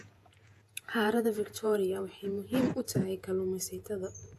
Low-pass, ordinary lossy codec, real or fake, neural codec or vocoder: 14.4 kHz; AAC, 64 kbps; fake; vocoder, 48 kHz, 128 mel bands, Vocos